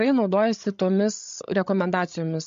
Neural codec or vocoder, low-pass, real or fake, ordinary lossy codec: codec, 16 kHz, 16 kbps, FreqCodec, larger model; 7.2 kHz; fake; MP3, 48 kbps